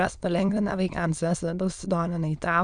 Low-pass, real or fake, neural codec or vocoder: 9.9 kHz; fake; autoencoder, 22.05 kHz, a latent of 192 numbers a frame, VITS, trained on many speakers